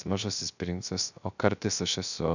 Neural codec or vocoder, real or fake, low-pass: codec, 16 kHz, 0.3 kbps, FocalCodec; fake; 7.2 kHz